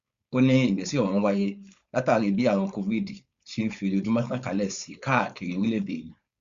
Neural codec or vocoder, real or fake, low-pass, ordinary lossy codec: codec, 16 kHz, 4.8 kbps, FACodec; fake; 7.2 kHz; Opus, 64 kbps